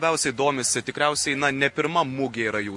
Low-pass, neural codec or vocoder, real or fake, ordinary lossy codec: 10.8 kHz; none; real; MP3, 48 kbps